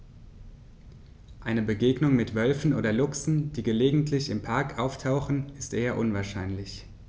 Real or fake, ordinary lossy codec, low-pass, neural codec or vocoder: real; none; none; none